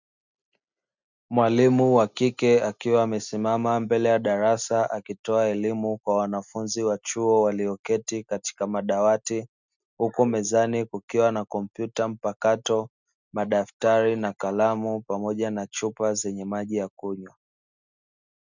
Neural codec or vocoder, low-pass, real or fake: none; 7.2 kHz; real